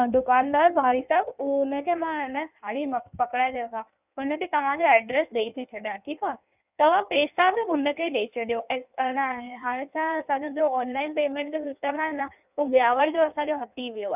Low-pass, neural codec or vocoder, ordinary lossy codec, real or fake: 3.6 kHz; codec, 16 kHz in and 24 kHz out, 1.1 kbps, FireRedTTS-2 codec; none; fake